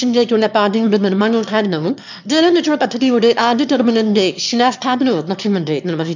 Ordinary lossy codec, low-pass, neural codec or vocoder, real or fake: none; 7.2 kHz; autoencoder, 22.05 kHz, a latent of 192 numbers a frame, VITS, trained on one speaker; fake